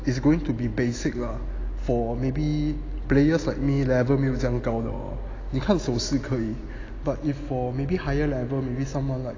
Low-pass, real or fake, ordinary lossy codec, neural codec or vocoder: 7.2 kHz; real; AAC, 32 kbps; none